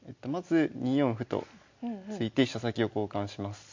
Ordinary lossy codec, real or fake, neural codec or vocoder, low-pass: MP3, 48 kbps; real; none; 7.2 kHz